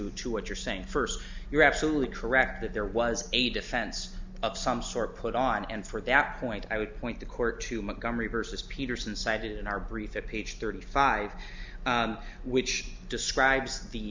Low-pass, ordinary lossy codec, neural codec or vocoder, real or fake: 7.2 kHz; MP3, 64 kbps; none; real